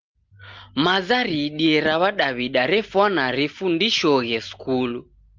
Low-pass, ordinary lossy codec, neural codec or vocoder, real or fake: 7.2 kHz; Opus, 24 kbps; none; real